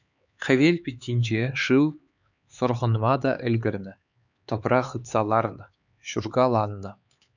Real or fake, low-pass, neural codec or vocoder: fake; 7.2 kHz; codec, 16 kHz, 4 kbps, X-Codec, HuBERT features, trained on LibriSpeech